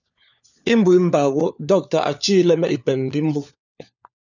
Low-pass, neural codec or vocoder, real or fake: 7.2 kHz; codec, 16 kHz, 4 kbps, FunCodec, trained on LibriTTS, 50 frames a second; fake